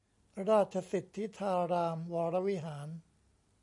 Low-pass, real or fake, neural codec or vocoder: 10.8 kHz; real; none